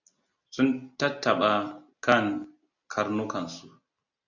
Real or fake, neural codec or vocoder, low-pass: real; none; 7.2 kHz